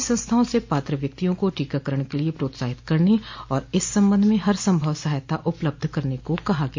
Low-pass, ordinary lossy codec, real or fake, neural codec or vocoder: 7.2 kHz; MP3, 48 kbps; real; none